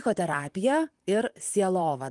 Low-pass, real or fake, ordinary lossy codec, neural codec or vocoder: 10.8 kHz; fake; Opus, 24 kbps; vocoder, 44.1 kHz, 128 mel bands, Pupu-Vocoder